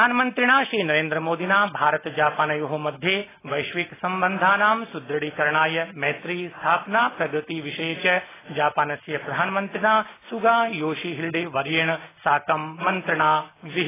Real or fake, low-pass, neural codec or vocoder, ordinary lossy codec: real; 3.6 kHz; none; AAC, 16 kbps